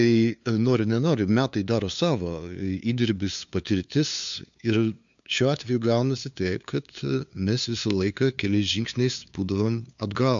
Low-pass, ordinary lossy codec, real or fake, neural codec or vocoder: 7.2 kHz; MP3, 64 kbps; fake; codec, 16 kHz, 2 kbps, FunCodec, trained on LibriTTS, 25 frames a second